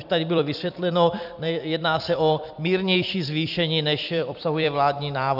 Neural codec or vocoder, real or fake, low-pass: vocoder, 44.1 kHz, 128 mel bands every 256 samples, BigVGAN v2; fake; 5.4 kHz